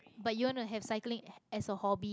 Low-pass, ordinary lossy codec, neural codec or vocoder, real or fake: none; none; none; real